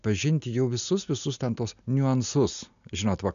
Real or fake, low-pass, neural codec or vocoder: real; 7.2 kHz; none